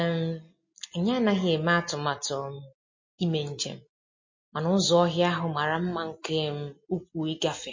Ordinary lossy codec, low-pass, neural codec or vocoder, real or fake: MP3, 32 kbps; 7.2 kHz; none; real